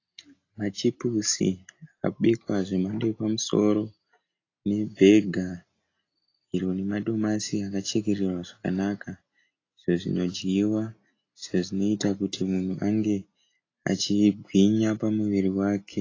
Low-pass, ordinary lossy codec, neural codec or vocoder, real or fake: 7.2 kHz; AAC, 32 kbps; none; real